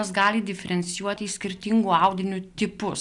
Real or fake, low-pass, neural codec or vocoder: real; 10.8 kHz; none